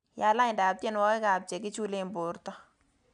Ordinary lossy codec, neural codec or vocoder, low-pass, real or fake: none; none; 9.9 kHz; real